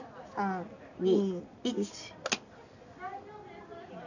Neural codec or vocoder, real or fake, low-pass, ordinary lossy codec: none; real; 7.2 kHz; none